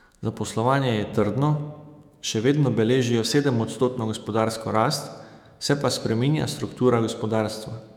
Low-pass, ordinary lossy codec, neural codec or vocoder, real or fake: 19.8 kHz; none; autoencoder, 48 kHz, 128 numbers a frame, DAC-VAE, trained on Japanese speech; fake